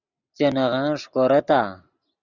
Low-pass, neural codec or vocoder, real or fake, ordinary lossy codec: 7.2 kHz; none; real; Opus, 64 kbps